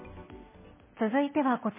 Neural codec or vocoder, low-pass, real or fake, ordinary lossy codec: none; 3.6 kHz; real; MP3, 16 kbps